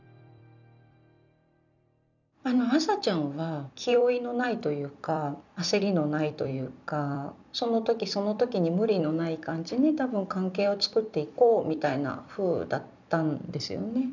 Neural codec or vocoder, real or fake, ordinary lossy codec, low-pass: vocoder, 44.1 kHz, 128 mel bands every 256 samples, BigVGAN v2; fake; none; 7.2 kHz